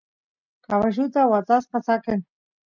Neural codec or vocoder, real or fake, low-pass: none; real; 7.2 kHz